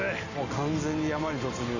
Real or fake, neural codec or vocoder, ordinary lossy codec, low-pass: real; none; none; 7.2 kHz